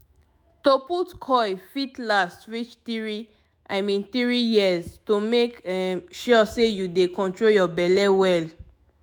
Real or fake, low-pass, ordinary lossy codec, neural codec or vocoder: fake; none; none; autoencoder, 48 kHz, 128 numbers a frame, DAC-VAE, trained on Japanese speech